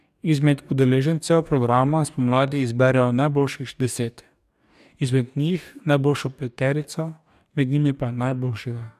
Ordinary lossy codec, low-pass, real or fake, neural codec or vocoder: none; 14.4 kHz; fake; codec, 44.1 kHz, 2.6 kbps, DAC